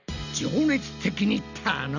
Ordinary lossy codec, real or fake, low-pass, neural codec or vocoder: none; real; 7.2 kHz; none